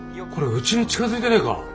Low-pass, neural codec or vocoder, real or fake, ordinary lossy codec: none; none; real; none